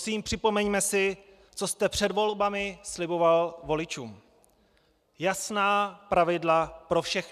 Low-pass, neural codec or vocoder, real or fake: 14.4 kHz; none; real